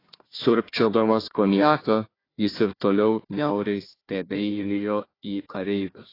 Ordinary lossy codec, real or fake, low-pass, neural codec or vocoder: AAC, 24 kbps; fake; 5.4 kHz; codec, 16 kHz, 1 kbps, FunCodec, trained on Chinese and English, 50 frames a second